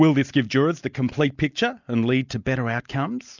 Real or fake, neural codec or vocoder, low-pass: real; none; 7.2 kHz